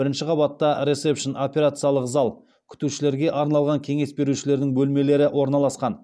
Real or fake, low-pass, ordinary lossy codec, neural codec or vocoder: real; none; none; none